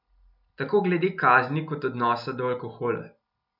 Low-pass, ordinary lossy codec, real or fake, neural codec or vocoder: 5.4 kHz; none; real; none